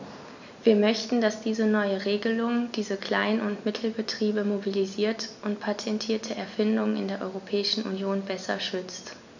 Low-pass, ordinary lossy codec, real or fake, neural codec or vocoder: 7.2 kHz; none; real; none